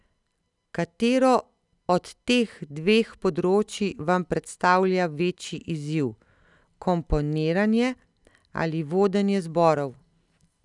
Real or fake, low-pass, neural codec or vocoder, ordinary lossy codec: real; 10.8 kHz; none; none